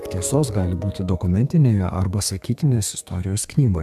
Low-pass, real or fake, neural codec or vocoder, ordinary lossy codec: 14.4 kHz; fake; codec, 32 kHz, 1.9 kbps, SNAC; MP3, 96 kbps